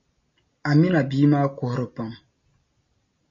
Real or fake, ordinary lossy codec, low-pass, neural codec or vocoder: real; MP3, 32 kbps; 7.2 kHz; none